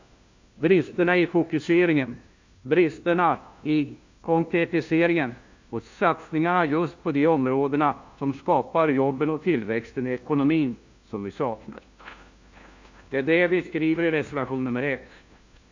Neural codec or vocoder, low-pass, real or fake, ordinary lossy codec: codec, 16 kHz, 1 kbps, FunCodec, trained on LibriTTS, 50 frames a second; 7.2 kHz; fake; AAC, 48 kbps